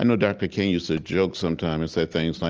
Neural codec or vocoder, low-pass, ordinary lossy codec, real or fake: none; 7.2 kHz; Opus, 24 kbps; real